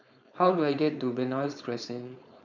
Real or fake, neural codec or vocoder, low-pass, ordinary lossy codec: fake; codec, 16 kHz, 4.8 kbps, FACodec; 7.2 kHz; none